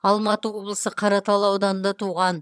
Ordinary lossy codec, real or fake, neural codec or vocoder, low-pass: none; fake; vocoder, 22.05 kHz, 80 mel bands, HiFi-GAN; none